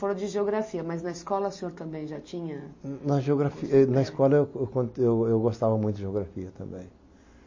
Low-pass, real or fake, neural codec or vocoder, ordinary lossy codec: 7.2 kHz; real; none; MP3, 32 kbps